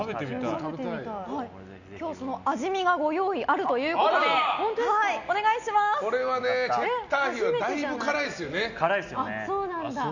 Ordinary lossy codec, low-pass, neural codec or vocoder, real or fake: none; 7.2 kHz; none; real